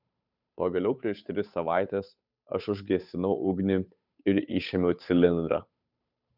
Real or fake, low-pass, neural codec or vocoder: fake; 5.4 kHz; codec, 16 kHz, 8 kbps, FunCodec, trained on Chinese and English, 25 frames a second